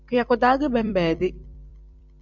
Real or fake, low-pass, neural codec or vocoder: fake; 7.2 kHz; vocoder, 44.1 kHz, 128 mel bands every 256 samples, BigVGAN v2